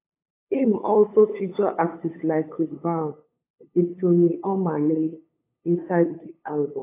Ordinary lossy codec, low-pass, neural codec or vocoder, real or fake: AAC, 24 kbps; 3.6 kHz; codec, 16 kHz, 8 kbps, FunCodec, trained on LibriTTS, 25 frames a second; fake